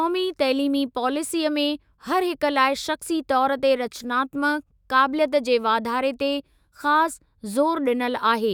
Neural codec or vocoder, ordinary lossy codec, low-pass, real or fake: autoencoder, 48 kHz, 128 numbers a frame, DAC-VAE, trained on Japanese speech; none; none; fake